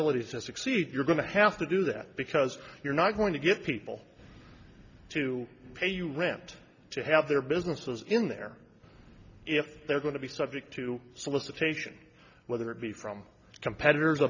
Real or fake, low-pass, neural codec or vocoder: real; 7.2 kHz; none